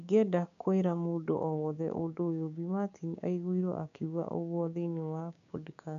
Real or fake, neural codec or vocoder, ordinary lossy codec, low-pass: fake; codec, 16 kHz, 6 kbps, DAC; AAC, 96 kbps; 7.2 kHz